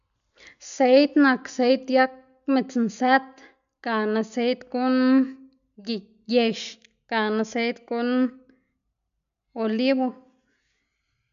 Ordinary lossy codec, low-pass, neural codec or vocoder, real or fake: none; 7.2 kHz; none; real